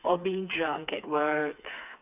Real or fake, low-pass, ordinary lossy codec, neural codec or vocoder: fake; 3.6 kHz; none; codec, 16 kHz, 4 kbps, FreqCodec, smaller model